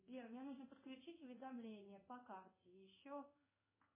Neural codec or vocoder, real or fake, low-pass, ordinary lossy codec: codec, 16 kHz in and 24 kHz out, 1 kbps, XY-Tokenizer; fake; 3.6 kHz; MP3, 16 kbps